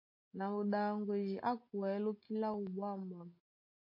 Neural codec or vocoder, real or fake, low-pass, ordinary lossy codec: none; real; 5.4 kHz; MP3, 32 kbps